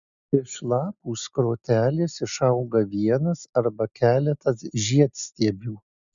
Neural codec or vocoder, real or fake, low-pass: none; real; 7.2 kHz